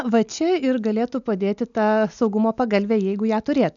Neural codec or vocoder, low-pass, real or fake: none; 7.2 kHz; real